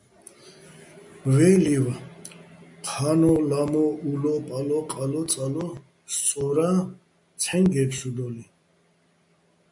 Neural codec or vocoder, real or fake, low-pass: none; real; 10.8 kHz